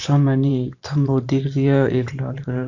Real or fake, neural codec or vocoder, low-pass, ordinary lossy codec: fake; vocoder, 44.1 kHz, 128 mel bands every 512 samples, BigVGAN v2; 7.2 kHz; AAC, 48 kbps